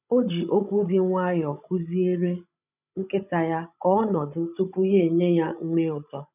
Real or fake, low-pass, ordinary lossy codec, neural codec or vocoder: fake; 3.6 kHz; none; codec, 16 kHz, 16 kbps, FreqCodec, larger model